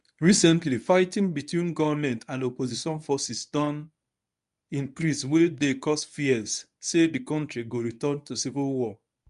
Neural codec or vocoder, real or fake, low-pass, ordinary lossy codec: codec, 24 kHz, 0.9 kbps, WavTokenizer, medium speech release version 1; fake; 10.8 kHz; none